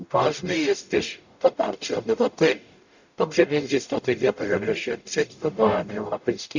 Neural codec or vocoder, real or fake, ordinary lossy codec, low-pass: codec, 44.1 kHz, 0.9 kbps, DAC; fake; none; 7.2 kHz